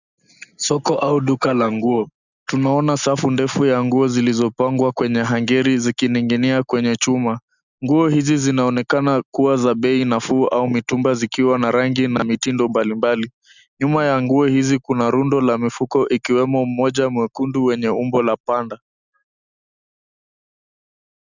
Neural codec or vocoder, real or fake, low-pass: none; real; 7.2 kHz